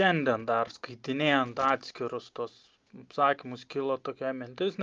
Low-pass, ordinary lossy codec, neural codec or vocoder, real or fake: 7.2 kHz; Opus, 24 kbps; none; real